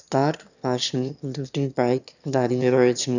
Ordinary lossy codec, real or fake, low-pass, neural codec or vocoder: none; fake; 7.2 kHz; autoencoder, 22.05 kHz, a latent of 192 numbers a frame, VITS, trained on one speaker